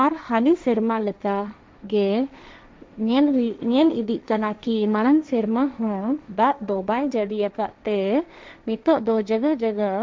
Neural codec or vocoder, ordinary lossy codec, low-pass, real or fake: codec, 16 kHz, 1.1 kbps, Voila-Tokenizer; none; none; fake